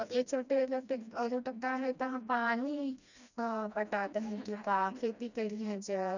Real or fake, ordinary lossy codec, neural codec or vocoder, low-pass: fake; none; codec, 16 kHz, 1 kbps, FreqCodec, smaller model; 7.2 kHz